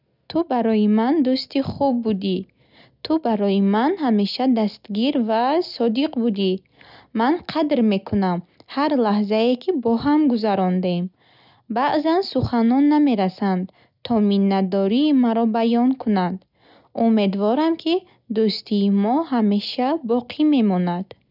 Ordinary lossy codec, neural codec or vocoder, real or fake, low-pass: none; none; real; 5.4 kHz